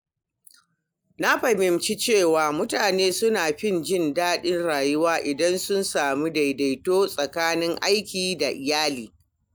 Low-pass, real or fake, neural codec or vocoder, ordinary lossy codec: none; real; none; none